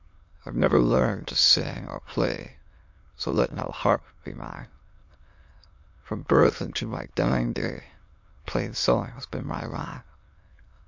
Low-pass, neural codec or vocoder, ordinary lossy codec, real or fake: 7.2 kHz; autoencoder, 22.05 kHz, a latent of 192 numbers a frame, VITS, trained on many speakers; MP3, 48 kbps; fake